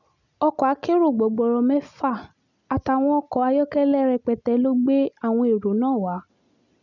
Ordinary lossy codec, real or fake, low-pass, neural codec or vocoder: none; real; 7.2 kHz; none